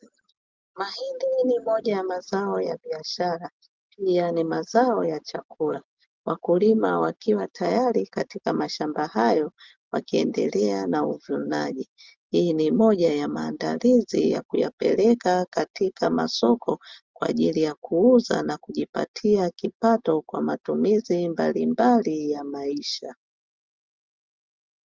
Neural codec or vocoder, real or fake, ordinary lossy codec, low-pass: none; real; Opus, 32 kbps; 7.2 kHz